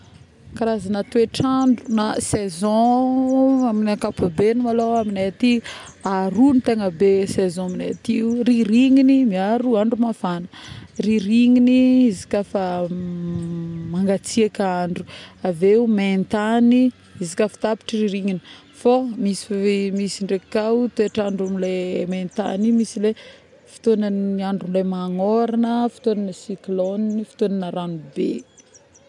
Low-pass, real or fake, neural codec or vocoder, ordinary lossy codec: 10.8 kHz; real; none; none